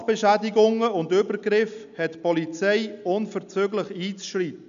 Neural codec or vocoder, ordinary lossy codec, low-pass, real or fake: none; none; 7.2 kHz; real